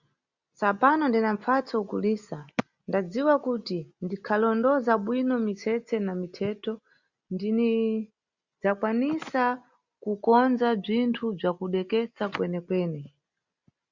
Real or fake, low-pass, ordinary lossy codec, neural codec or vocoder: real; 7.2 kHz; Opus, 64 kbps; none